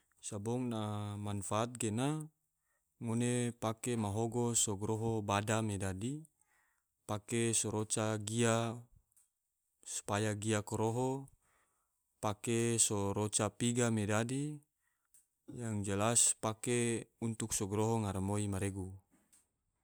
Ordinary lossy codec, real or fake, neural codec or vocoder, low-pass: none; real; none; none